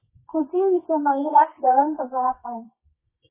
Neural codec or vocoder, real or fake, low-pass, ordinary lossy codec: codec, 24 kHz, 0.9 kbps, WavTokenizer, medium music audio release; fake; 3.6 kHz; MP3, 16 kbps